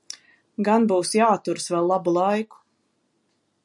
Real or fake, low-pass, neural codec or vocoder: real; 10.8 kHz; none